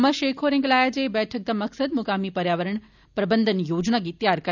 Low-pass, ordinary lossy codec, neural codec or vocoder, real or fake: 7.2 kHz; none; none; real